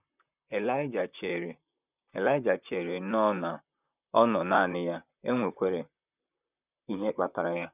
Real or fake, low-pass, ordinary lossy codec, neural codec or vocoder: fake; 3.6 kHz; none; vocoder, 44.1 kHz, 128 mel bands, Pupu-Vocoder